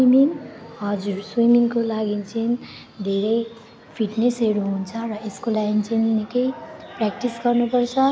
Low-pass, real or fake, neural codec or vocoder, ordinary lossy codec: none; real; none; none